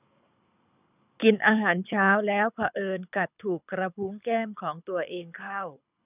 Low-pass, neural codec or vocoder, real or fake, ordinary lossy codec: 3.6 kHz; codec, 24 kHz, 6 kbps, HILCodec; fake; none